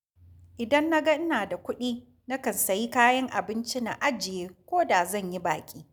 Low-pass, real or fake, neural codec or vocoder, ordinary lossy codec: none; real; none; none